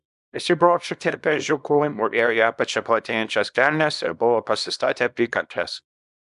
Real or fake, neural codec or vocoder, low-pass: fake; codec, 24 kHz, 0.9 kbps, WavTokenizer, small release; 10.8 kHz